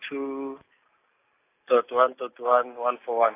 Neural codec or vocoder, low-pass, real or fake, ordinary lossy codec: none; 3.6 kHz; real; AAC, 24 kbps